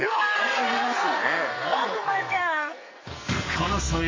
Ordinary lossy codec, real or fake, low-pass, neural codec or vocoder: MP3, 32 kbps; fake; 7.2 kHz; codec, 44.1 kHz, 2.6 kbps, SNAC